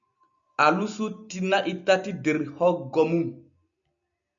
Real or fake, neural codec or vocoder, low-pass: real; none; 7.2 kHz